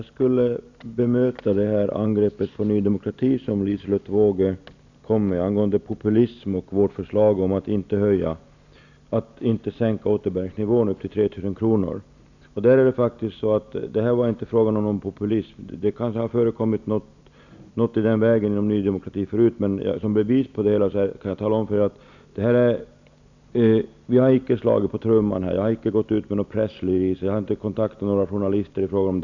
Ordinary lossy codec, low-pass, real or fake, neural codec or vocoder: none; 7.2 kHz; real; none